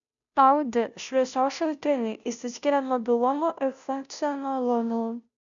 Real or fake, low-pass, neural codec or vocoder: fake; 7.2 kHz; codec, 16 kHz, 0.5 kbps, FunCodec, trained on Chinese and English, 25 frames a second